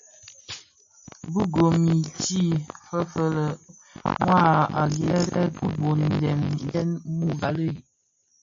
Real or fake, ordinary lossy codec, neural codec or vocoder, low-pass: real; AAC, 64 kbps; none; 7.2 kHz